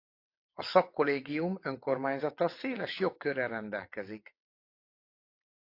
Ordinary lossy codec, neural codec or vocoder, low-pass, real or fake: AAC, 32 kbps; vocoder, 22.05 kHz, 80 mel bands, WaveNeXt; 5.4 kHz; fake